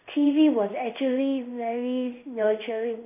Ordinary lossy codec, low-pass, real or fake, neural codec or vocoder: none; 3.6 kHz; fake; codec, 16 kHz in and 24 kHz out, 1 kbps, XY-Tokenizer